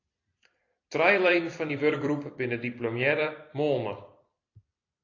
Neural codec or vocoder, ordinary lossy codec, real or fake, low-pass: vocoder, 44.1 kHz, 128 mel bands every 256 samples, BigVGAN v2; AAC, 32 kbps; fake; 7.2 kHz